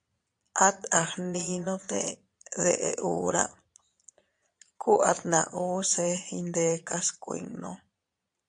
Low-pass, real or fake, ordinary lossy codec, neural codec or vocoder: 9.9 kHz; fake; AAC, 64 kbps; vocoder, 22.05 kHz, 80 mel bands, Vocos